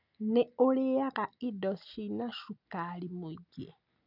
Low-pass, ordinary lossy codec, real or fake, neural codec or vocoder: 5.4 kHz; none; real; none